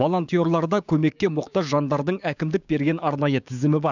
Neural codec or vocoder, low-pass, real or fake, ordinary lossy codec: codec, 16 kHz, 6 kbps, DAC; 7.2 kHz; fake; none